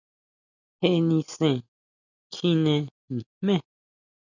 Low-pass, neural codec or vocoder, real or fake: 7.2 kHz; none; real